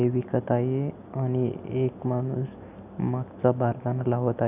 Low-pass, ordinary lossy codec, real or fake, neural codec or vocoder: 3.6 kHz; AAC, 32 kbps; real; none